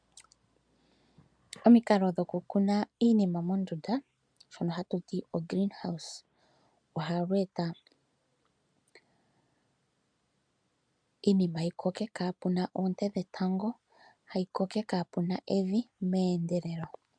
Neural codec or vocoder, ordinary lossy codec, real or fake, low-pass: none; MP3, 96 kbps; real; 9.9 kHz